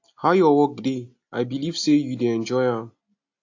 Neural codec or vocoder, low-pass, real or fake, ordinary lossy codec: none; 7.2 kHz; real; AAC, 48 kbps